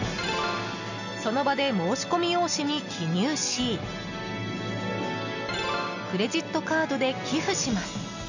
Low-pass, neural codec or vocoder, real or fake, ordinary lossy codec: 7.2 kHz; none; real; none